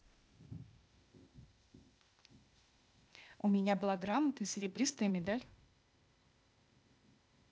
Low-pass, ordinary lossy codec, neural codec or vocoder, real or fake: none; none; codec, 16 kHz, 0.8 kbps, ZipCodec; fake